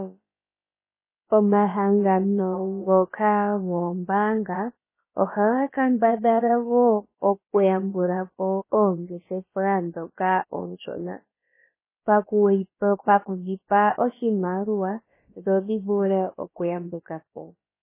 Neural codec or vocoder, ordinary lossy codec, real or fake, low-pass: codec, 16 kHz, about 1 kbps, DyCAST, with the encoder's durations; MP3, 16 kbps; fake; 3.6 kHz